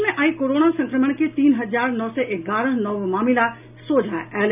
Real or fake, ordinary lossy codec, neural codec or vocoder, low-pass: real; none; none; 3.6 kHz